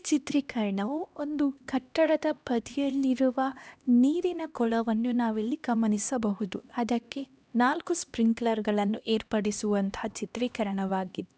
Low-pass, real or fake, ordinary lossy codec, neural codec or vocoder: none; fake; none; codec, 16 kHz, 1 kbps, X-Codec, HuBERT features, trained on LibriSpeech